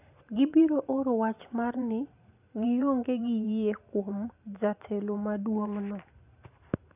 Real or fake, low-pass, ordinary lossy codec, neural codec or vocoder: fake; 3.6 kHz; none; vocoder, 44.1 kHz, 128 mel bands every 512 samples, BigVGAN v2